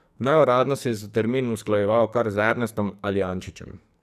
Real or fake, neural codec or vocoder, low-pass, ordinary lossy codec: fake; codec, 44.1 kHz, 2.6 kbps, SNAC; 14.4 kHz; none